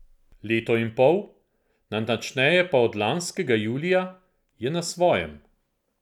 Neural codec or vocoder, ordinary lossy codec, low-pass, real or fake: none; none; 19.8 kHz; real